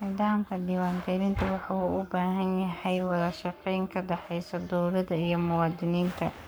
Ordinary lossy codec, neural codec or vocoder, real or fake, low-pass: none; codec, 44.1 kHz, 7.8 kbps, Pupu-Codec; fake; none